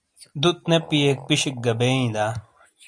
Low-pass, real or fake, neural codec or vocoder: 9.9 kHz; real; none